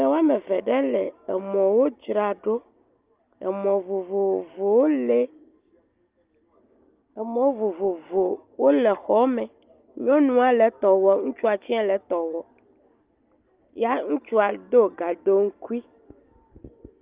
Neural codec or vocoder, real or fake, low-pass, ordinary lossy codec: none; real; 3.6 kHz; Opus, 32 kbps